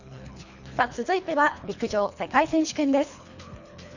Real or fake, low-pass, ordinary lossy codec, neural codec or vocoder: fake; 7.2 kHz; none; codec, 24 kHz, 3 kbps, HILCodec